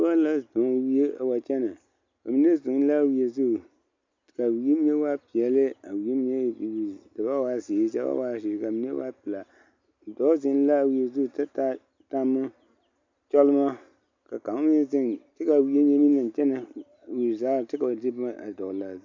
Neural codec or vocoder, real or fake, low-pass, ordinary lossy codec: none; real; 7.2 kHz; MP3, 64 kbps